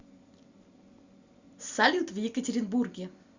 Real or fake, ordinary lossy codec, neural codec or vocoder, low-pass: real; Opus, 64 kbps; none; 7.2 kHz